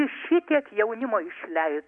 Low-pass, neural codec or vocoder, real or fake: 9.9 kHz; none; real